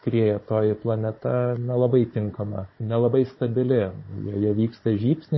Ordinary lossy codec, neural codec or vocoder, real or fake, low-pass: MP3, 24 kbps; codec, 16 kHz, 16 kbps, FunCodec, trained on Chinese and English, 50 frames a second; fake; 7.2 kHz